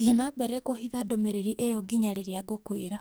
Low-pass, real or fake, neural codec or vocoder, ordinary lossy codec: none; fake; codec, 44.1 kHz, 2.6 kbps, SNAC; none